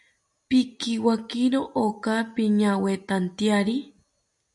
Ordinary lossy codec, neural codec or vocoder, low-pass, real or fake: MP3, 96 kbps; none; 10.8 kHz; real